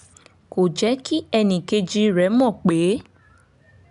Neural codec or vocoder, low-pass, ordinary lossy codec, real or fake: none; 10.8 kHz; none; real